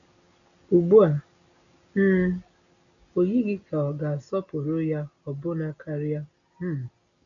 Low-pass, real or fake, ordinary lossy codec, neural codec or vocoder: 7.2 kHz; real; none; none